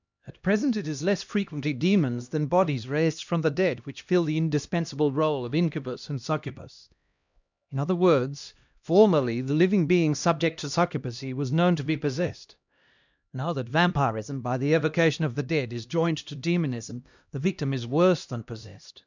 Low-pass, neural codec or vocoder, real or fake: 7.2 kHz; codec, 16 kHz, 1 kbps, X-Codec, HuBERT features, trained on LibriSpeech; fake